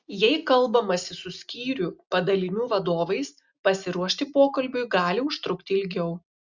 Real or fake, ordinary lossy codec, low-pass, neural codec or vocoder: real; Opus, 64 kbps; 7.2 kHz; none